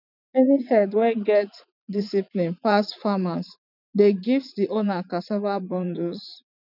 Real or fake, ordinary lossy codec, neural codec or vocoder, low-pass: fake; none; vocoder, 44.1 kHz, 80 mel bands, Vocos; 5.4 kHz